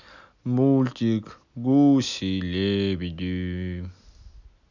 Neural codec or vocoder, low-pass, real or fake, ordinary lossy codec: none; 7.2 kHz; real; none